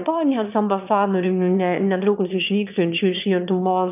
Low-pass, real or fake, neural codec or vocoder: 3.6 kHz; fake; autoencoder, 22.05 kHz, a latent of 192 numbers a frame, VITS, trained on one speaker